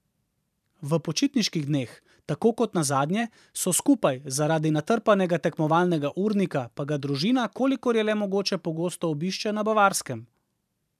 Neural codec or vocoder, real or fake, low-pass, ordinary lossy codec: vocoder, 44.1 kHz, 128 mel bands every 512 samples, BigVGAN v2; fake; 14.4 kHz; none